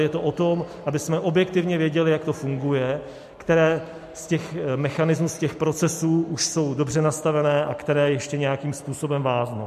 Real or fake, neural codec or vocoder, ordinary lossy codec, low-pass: real; none; MP3, 64 kbps; 14.4 kHz